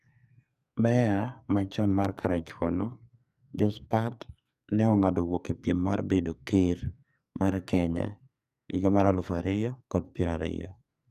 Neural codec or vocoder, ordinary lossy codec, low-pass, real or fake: codec, 44.1 kHz, 2.6 kbps, SNAC; none; 14.4 kHz; fake